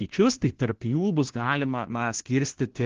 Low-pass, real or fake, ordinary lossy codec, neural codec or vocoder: 7.2 kHz; fake; Opus, 16 kbps; codec, 16 kHz, 1 kbps, FunCodec, trained on LibriTTS, 50 frames a second